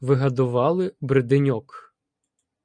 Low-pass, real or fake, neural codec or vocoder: 9.9 kHz; real; none